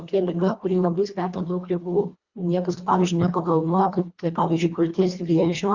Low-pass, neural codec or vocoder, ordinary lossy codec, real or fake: 7.2 kHz; codec, 24 kHz, 1.5 kbps, HILCodec; Opus, 64 kbps; fake